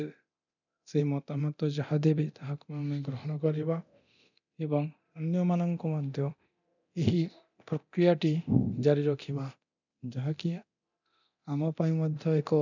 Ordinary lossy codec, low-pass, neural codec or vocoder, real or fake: none; 7.2 kHz; codec, 24 kHz, 0.9 kbps, DualCodec; fake